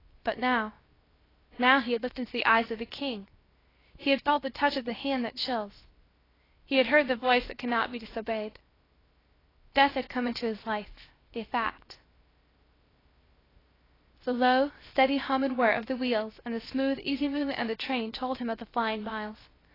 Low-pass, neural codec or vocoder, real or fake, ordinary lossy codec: 5.4 kHz; codec, 16 kHz, 0.7 kbps, FocalCodec; fake; AAC, 24 kbps